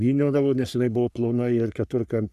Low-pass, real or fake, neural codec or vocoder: 14.4 kHz; fake; codec, 44.1 kHz, 3.4 kbps, Pupu-Codec